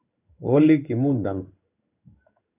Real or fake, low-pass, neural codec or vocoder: fake; 3.6 kHz; codec, 16 kHz in and 24 kHz out, 1 kbps, XY-Tokenizer